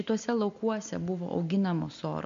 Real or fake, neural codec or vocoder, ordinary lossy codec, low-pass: real; none; MP3, 48 kbps; 7.2 kHz